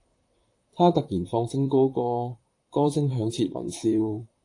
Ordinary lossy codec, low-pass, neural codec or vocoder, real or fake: AAC, 48 kbps; 10.8 kHz; vocoder, 44.1 kHz, 128 mel bands, Pupu-Vocoder; fake